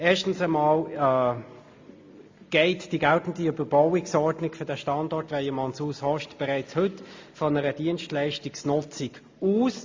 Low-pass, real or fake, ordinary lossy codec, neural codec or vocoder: 7.2 kHz; real; MP3, 48 kbps; none